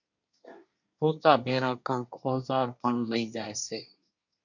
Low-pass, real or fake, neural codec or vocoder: 7.2 kHz; fake; codec, 24 kHz, 1 kbps, SNAC